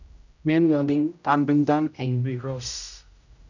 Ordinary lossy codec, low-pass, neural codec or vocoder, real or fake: none; 7.2 kHz; codec, 16 kHz, 0.5 kbps, X-Codec, HuBERT features, trained on general audio; fake